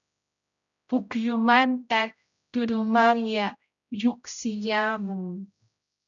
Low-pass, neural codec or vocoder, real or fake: 7.2 kHz; codec, 16 kHz, 0.5 kbps, X-Codec, HuBERT features, trained on general audio; fake